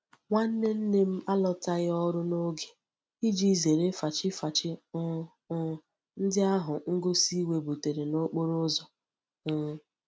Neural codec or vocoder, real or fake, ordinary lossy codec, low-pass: none; real; none; none